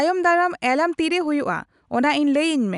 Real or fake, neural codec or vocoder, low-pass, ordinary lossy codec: real; none; 10.8 kHz; none